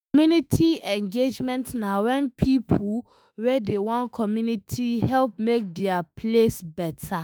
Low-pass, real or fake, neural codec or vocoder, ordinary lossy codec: none; fake; autoencoder, 48 kHz, 32 numbers a frame, DAC-VAE, trained on Japanese speech; none